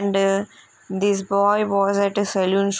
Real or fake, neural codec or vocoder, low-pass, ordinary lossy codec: real; none; none; none